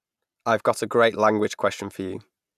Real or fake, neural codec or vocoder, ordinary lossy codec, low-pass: real; none; none; 14.4 kHz